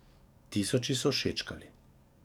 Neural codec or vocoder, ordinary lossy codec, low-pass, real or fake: codec, 44.1 kHz, 7.8 kbps, DAC; none; 19.8 kHz; fake